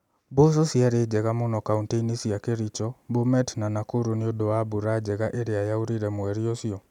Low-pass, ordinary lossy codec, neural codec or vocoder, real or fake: 19.8 kHz; none; none; real